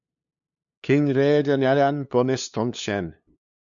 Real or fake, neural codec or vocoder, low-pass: fake; codec, 16 kHz, 2 kbps, FunCodec, trained on LibriTTS, 25 frames a second; 7.2 kHz